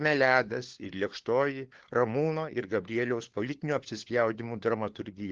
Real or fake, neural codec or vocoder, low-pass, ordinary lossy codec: fake; codec, 16 kHz, 4 kbps, FunCodec, trained on LibriTTS, 50 frames a second; 7.2 kHz; Opus, 16 kbps